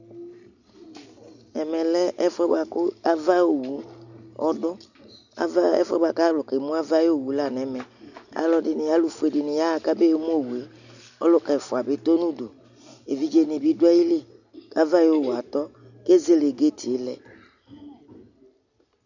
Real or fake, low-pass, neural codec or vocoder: real; 7.2 kHz; none